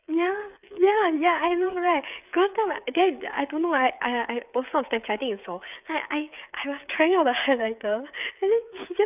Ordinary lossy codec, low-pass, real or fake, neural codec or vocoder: none; 3.6 kHz; fake; codec, 16 kHz, 8 kbps, FreqCodec, smaller model